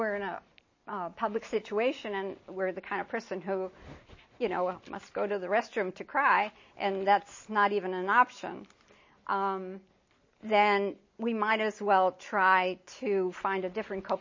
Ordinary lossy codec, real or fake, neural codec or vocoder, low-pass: MP3, 32 kbps; real; none; 7.2 kHz